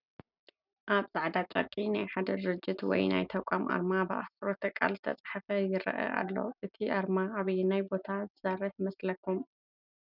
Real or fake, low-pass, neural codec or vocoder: real; 5.4 kHz; none